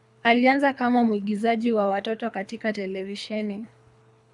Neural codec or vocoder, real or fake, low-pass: codec, 24 kHz, 3 kbps, HILCodec; fake; 10.8 kHz